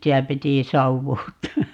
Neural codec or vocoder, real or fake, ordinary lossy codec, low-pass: none; real; none; 19.8 kHz